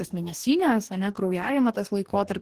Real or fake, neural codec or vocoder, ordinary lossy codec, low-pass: fake; codec, 44.1 kHz, 2.6 kbps, DAC; Opus, 16 kbps; 14.4 kHz